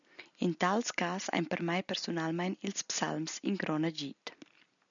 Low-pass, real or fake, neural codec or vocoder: 7.2 kHz; real; none